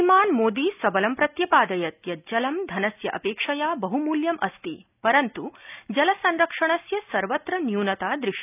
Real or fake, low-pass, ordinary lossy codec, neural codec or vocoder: real; 3.6 kHz; none; none